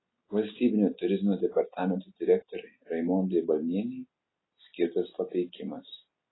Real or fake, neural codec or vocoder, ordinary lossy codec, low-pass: real; none; AAC, 16 kbps; 7.2 kHz